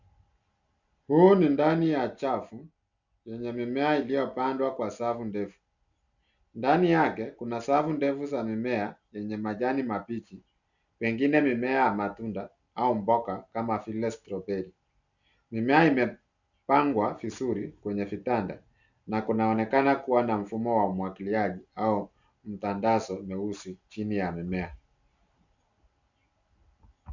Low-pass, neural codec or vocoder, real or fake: 7.2 kHz; none; real